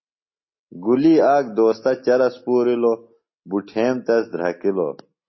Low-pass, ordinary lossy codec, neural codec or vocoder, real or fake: 7.2 kHz; MP3, 24 kbps; none; real